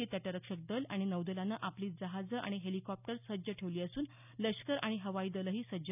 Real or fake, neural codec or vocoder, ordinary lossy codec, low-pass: real; none; none; 3.6 kHz